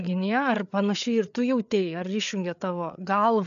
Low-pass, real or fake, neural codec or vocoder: 7.2 kHz; fake; codec, 16 kHz, 4 kbps, FreqCodec, larger model